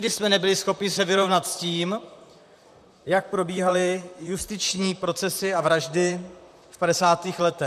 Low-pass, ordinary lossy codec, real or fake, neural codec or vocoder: 14.4 kHz; MP3, 96 kbps; fake; vocoder, 44.1 kHz, 128 mel bands, Pupu-Vocoder